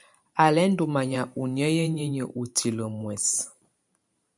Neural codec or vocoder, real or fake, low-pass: vocoder, 44.1 kHz, 128 mel bands every 512 samples, BigVGAN v2; fake; 10.8 kHz